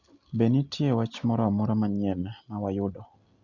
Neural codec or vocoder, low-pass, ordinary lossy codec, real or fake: none; 7.2 kHz; Opus, 64 kbps; real